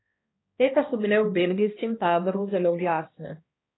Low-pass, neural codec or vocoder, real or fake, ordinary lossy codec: 7.2 kHz; codec, 16 kHz, 1 kbps, X-Codec, HuBERT features, trained on balanced general audio; fake; AAC, 16 kbps